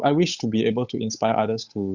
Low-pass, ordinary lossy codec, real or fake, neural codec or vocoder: 7.2 kHz; Opus, 64 kbps; fake; codec, 16 kHz, 8 kbps, FunCodec, trained on Chinese and English, 25 frames a second